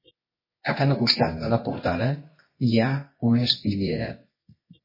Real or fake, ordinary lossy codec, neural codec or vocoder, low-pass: fake; MP3, 24 kbps; codec, 24 kHz, 0.9 kbps, WavTokenizer, medium music audio release; 5.4 kHz